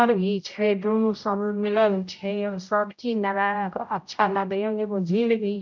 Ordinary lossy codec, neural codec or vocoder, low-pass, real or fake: none; codec, 16 kHz, 0.5 kbps, X-Codec, HuBERT features, trained on general audio; 7.2 kHz; fake